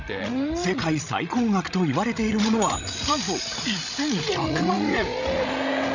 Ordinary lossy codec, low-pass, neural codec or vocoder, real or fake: none; 7.2 kHz; codec, 16 kHz, 16 kbps, FreqCodec, larger model; fake